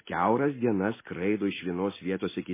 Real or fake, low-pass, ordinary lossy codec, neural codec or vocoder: real; 3.6 kHz; MP3, 16 kbps; none